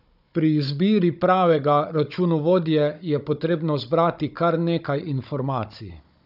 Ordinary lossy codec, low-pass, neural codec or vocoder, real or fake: none; 5.4 kHz; codec, 16 kHz, 16 kbps, FunCodec, trained on Chinese and English, 50 frames a second; fake